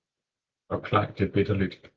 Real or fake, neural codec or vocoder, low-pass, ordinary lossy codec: real; none; 7.2 kHz; Opus, 16 kbps